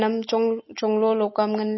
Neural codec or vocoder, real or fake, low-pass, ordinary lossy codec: none; real; 7.2 kHz; MP3, 24 kbps